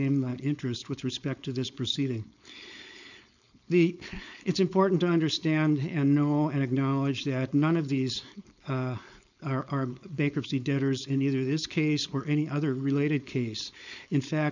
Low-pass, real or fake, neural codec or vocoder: 7.2 kHz; fake; codec, 16 kHz, 4.8 kbps, FACodec